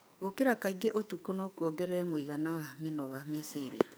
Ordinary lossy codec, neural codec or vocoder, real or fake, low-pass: none; codec, 44.1 kHz, 2.6 kbps, SNAC; fake; none